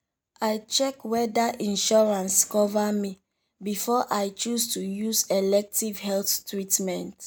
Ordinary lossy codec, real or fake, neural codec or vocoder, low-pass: none; real; none; none